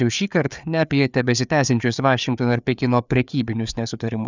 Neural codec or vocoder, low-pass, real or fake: codec, 16 kHz, 4 kbps, FreqCodec, larger model; 7.2 kHz; fake